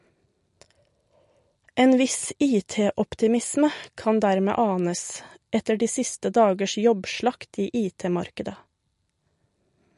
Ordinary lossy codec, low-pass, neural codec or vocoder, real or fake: MP3, 48 kbps; 14.4 kHz; none; real